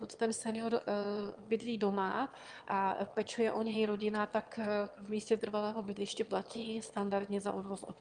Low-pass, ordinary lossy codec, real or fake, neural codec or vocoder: 9.9 kHz; Opus, 32 kbps; fake; autoencoder, 22.05 kHz, a latent of 192 numbers a frame, VITS, trained on one speaker